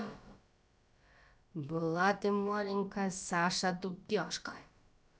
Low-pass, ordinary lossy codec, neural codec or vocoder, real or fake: none; none; codec, 16 kHz, about 1 kbps, DyCAST, with the encoder's durations; fake